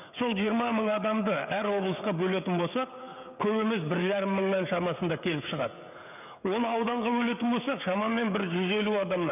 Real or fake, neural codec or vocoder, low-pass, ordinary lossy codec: fake; codec, 16 kHz, 16 kbps, FreqCodec, smaller model; 3.6 kHz; none